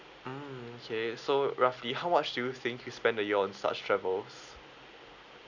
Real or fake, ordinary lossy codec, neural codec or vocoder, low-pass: real; none; none; 7.2 kHz